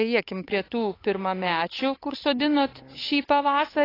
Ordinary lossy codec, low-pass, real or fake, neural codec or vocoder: AAC, 24 kbps; 5.4 kHz; fake; codec, 16 kHz, 8 kbps, FunCodec, trained on Chinese and English, 25 frames a second